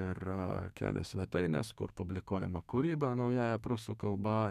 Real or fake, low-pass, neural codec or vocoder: fake; 14.4 kHz; codec, 32 kHz, 1.9 kbps, SNAC